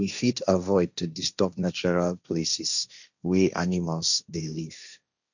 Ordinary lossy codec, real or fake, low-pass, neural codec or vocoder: none; fake; 7.2 kHz; codec, 16 kHz, 1.1 kbps, Voila-Tokenizer